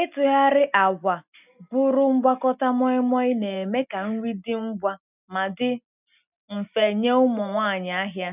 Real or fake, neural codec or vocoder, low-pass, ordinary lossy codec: real; none; 3.6 kHz; none